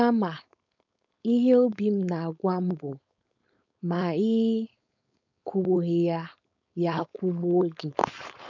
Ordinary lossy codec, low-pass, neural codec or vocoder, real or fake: none; 7.2 kHz; codec, 16 kHz, 4.8 kbps, FACodec; fake